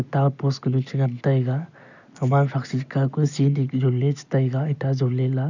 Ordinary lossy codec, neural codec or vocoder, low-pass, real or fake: none; none; 7.2 kHz; real